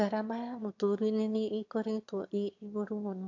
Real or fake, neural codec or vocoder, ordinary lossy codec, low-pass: fake; autoencoder, 22.05 kHz, a latent of 192 numbers a frame, VITS, trained on one speaker; AAC, 48 kbps; 7.2 kHz